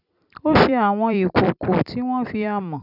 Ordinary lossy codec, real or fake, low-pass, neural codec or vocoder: none; fake; 5.4 kHz; vocoder, 44.1 kHz, 128 mel bands every 256 samples, BigVGAN v2